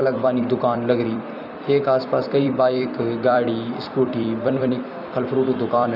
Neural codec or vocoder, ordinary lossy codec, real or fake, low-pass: vocoder, 44.1 kHz, 128 mel bands every 256 samples, BigVGAN v2; none; fake; 5.4 kHz